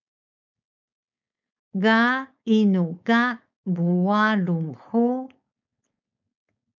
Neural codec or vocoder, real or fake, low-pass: codec, 16 kHz, 4.8 kbps, FACodec; fake; 7.2 kHz